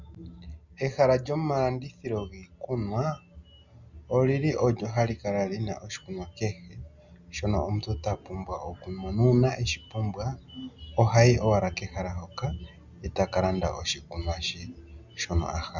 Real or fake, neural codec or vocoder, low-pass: real; none; 7.2 kHz